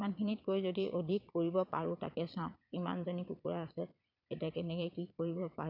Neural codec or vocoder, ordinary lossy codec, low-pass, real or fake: vocoder, 22.05 kHz, 80 mel bands, Vocos; none; 5.4 kHz; fake